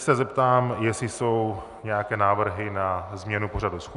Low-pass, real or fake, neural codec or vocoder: 10.8 kHz; real; none